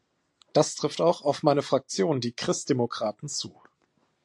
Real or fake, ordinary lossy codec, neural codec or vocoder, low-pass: real; AAC, 64 kbps; none; 10.8 kHz